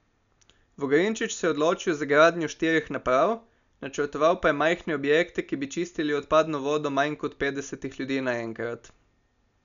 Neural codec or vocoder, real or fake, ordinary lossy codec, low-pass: none; real; none; 7.2 kHz